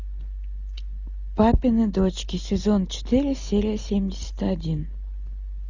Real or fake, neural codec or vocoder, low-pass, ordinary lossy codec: real; none; 7.2 kHz; Opus, 64 kbps